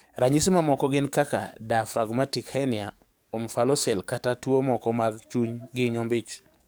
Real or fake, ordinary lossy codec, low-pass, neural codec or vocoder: fake; none; none; codec, 44.1 kHz, 7.8 kbps, DAC